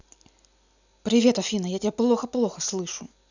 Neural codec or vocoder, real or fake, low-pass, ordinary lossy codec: none; real; 7.2 kHz; none